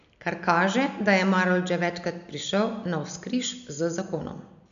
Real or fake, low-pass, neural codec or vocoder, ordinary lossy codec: real; 7.2 kHz; none; none